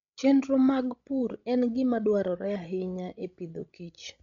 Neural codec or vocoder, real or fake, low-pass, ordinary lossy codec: codec, 16 kHz, 16 kbps, FreqCodec, larger model; fake; 7.2 kHz; none